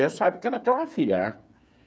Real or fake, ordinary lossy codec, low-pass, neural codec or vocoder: fake; none; none; codec, 16 kHz, 8 kbps, FreqCodec, smaller model